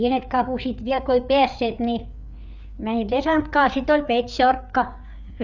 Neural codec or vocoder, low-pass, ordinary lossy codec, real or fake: codec, 16 kHz, 4 kbps, FreqCodec, larger model; 7.2 kHz; none; fake